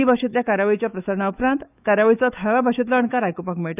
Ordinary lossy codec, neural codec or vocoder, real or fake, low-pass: none; autoencoder, 48 kHz, 128 numbers a frame, DAC-VAE, trained on Japanese speech; fake; 3.6 kHz